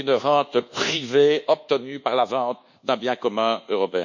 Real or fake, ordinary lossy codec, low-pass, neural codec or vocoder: fake; none; 7.2 kHz; codec, 24 kHz, 1.2 kbps, DualCodec